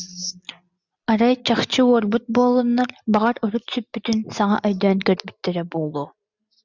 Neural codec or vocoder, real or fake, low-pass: none; real; 7.2 kHz